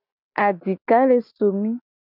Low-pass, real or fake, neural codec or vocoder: 5.4 kHz; real; none